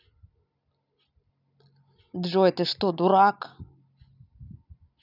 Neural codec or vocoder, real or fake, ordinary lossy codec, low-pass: none; real; none; 5.4 kHz